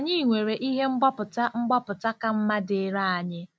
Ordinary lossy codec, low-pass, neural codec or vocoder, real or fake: none; none; none; real